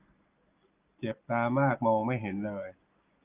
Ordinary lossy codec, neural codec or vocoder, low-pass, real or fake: Opus, 24 kbps; none; 3.6 kHz; real